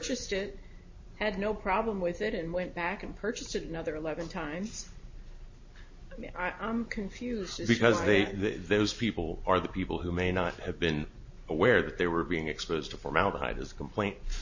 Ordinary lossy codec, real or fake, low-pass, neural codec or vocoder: MP3, 32 kbps; real; 7.2 kHz; none